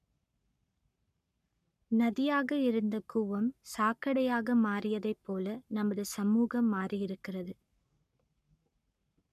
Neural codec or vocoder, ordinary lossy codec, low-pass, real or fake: codec, 44.1 kHz, 7.8 kbps, Pupu-Codec; none; 14.4 kHz; fake